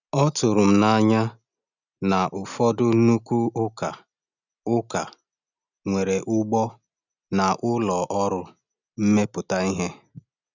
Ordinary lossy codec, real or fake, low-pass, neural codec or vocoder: none; real; 7.2 kHz; none